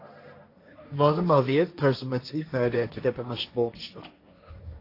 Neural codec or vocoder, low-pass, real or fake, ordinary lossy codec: codec, 16 kHz, 1.1 kbps, Voila-Tokenizer; 5.4 kHz; fake; AAC, 32 kbps